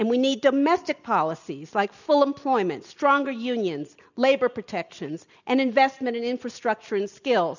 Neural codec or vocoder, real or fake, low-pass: none; real; 7.2 kHz